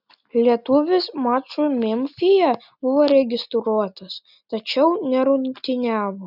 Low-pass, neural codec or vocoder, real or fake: 5.4 kHz; none; real